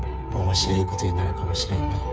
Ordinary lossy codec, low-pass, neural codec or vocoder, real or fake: none; none; codec, 16 kHz, 8 kbps, FreqCodec, smaller model; fake